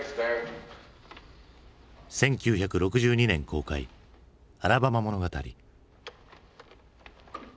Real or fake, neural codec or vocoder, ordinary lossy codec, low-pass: real; none; none; none